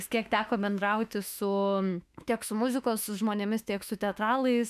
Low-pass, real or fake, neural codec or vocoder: 14.4 kHz; fake; autoencoder, 48 kHz, 32 numbers a frame, DAC-VAE, trained on Japanese speech